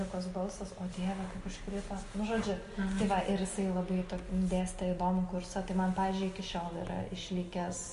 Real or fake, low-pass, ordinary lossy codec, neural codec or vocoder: real; 10.8 kHz; MP3, 48 kbps; none